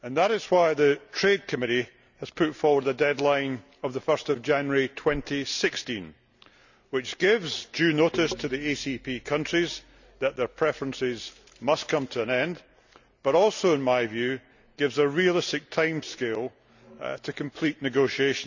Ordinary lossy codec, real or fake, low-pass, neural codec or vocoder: none; real; 7.2 kHz; none